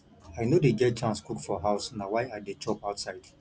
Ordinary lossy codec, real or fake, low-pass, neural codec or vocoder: none; real; none; none